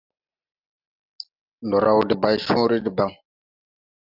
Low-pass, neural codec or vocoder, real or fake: 5.4 kHz; none; real